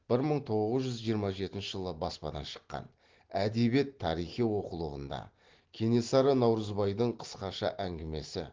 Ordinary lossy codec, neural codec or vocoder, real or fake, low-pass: Opus, 16 kbps; none; real; 7.2 kHz